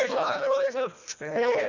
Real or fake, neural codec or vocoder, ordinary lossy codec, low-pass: fake; codec, 24 kHz, 1.5 kbps, HILCodec; none; 7.2 kHz